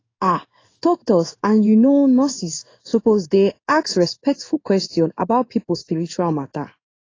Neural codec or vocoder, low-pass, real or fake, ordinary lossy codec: codec, 16 kHz in and 24 kHz out, 1 kbps, XY-Tokenizer; 7.2 kHz; fake; AAC, 32 kbps